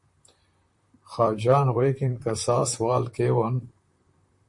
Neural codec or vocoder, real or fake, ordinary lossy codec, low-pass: vocoder, 44.1 kHz, 128 mel bands, Pupu-Vocoder; fake; MP3, 48 kbps; 10.8 kHz